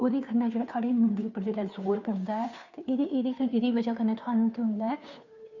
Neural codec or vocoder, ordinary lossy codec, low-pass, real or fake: codec, 16 kHz, 2 kbps, FunCodec, trained on Chinese and English, 25 frames a second; none; 7.2 kHz; fake